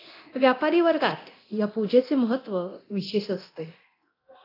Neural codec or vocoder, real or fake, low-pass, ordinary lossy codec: codec, 24 kHz, 0.9 kbps, DualCodec; fake; 5.4 kHz; AAC, 32 kbps